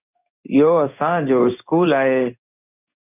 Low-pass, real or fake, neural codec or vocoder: 3.6 kHz; fake; codec, 16 kHz in and 24 kHz out, 1 kbps, XY-Tokenizer